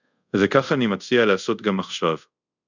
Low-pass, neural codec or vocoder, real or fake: 7.2 kHz; codec, 24 kHz, 0.5 kbps, DualCodec; fake